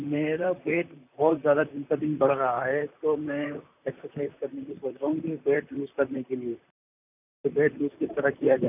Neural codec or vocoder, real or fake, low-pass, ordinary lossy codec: vocoder, 44.1 kHz, 128 mel bands, Pupu-Vocoder; fake; 3.6 kHz; none